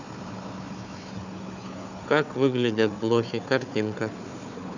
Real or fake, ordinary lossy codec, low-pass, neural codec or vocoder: fake; none; 7.2 kHz; codec, 16 kHz, 4 kbps, FunCodec, trained on Chinese and English, 50 frames a second